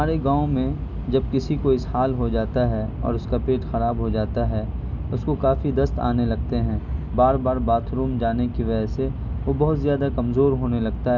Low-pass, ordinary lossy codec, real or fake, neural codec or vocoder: 7.2 kHz; none; real; none